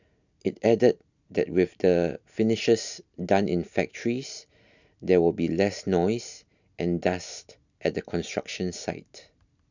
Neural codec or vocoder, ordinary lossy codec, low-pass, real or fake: none; none; 7.2 kHz; real